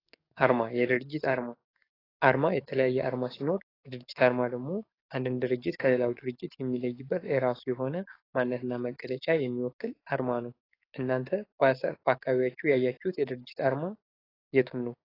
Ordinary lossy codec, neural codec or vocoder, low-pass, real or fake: AAC, 24 kbps; codec, 44.1 kHz, 7.8 kbps, DAC; 5.4 kHz; fake